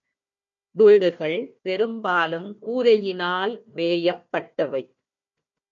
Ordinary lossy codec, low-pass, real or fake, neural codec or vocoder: MP3, 48 kbps; 7.2 kHz; fake; codec, 16 kHz, 1 kbps, FunCodec, trained on Chinese and English, 50 frames a second